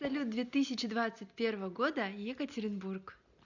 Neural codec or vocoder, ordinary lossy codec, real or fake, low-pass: none; none; real; 7.2 kHz